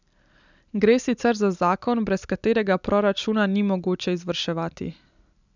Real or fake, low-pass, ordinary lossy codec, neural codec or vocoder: real; 7.2 kHz; none; none